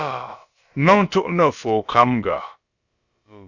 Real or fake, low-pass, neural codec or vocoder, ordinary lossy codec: fake; 7.2 kHz; codec, 16 kHz, about 1 kbps, DyCAST, with the encoder's durations; Opus, 64 kbps